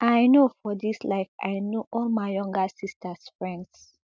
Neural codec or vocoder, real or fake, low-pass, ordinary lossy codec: none; real; none; none